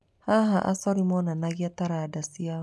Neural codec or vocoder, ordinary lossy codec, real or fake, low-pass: none; none; real; none